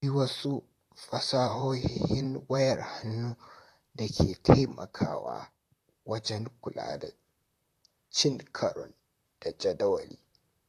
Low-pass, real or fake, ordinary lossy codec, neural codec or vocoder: 14.4 kHz; fake; AAC, 64 kbps; vocoder, 44.1 kHz, 128 mel bands, Pupu-Vocoder